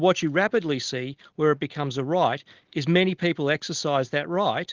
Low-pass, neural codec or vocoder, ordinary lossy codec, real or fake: 7.2 kHz; none; Opus, 16 kbps; real